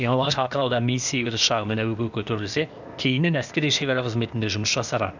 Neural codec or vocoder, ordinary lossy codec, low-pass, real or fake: codec, 16 kHz, 0.8 kbps, ZipCodec; none; 7.2 kHz; fake